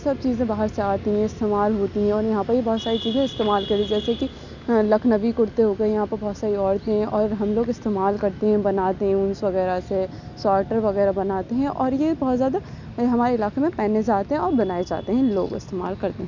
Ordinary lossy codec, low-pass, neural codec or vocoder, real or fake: none; 7.2 kHz; none; real